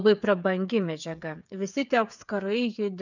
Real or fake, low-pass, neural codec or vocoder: fake; 7.2 kHz; codec, 16 kHz, 8 kbps, FreqCodec, smaller model